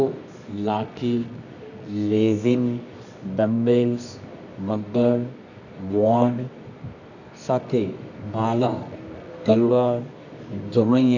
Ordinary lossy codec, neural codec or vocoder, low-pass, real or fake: none; codec, 24 kHz, 0.9 kbps, WavTokenizer, medium music audio release; 7.2 kHz; fake